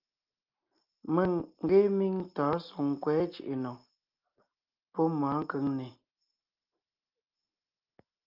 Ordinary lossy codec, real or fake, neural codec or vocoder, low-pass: Opus, 24 kbps; real; none; 5.4 kHz